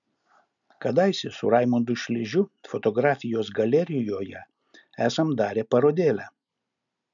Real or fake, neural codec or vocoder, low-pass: real; none; 7.2 kHz